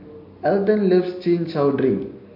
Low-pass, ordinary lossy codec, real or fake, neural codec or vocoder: 5.4 kHz; MP3, 32 kbps; real; none